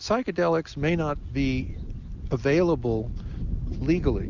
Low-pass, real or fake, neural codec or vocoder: 7.2 kHz; real; none